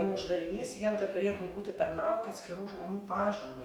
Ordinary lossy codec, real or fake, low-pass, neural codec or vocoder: Opus, 64 kbps; fake; 19.8 kHz; codec, 44.1 kHz, 2.6 kbps, DAC